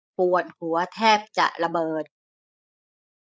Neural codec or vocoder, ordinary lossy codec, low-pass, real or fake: codec, 16 kHz, 8 kbps, FreqCodec, larger model; none; none; fake